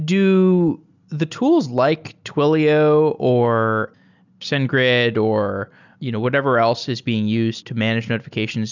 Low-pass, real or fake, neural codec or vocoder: 7.2 kHz; real; none